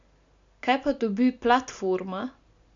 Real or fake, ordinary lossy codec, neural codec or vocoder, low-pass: real; MP3, 64 kbps; none; 7.2 kHz